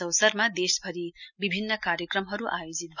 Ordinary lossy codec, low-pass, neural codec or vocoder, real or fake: none; 7.2 kHz; none; real